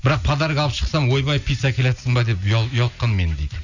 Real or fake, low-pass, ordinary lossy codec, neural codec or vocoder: real; 7.2 kHz; none; none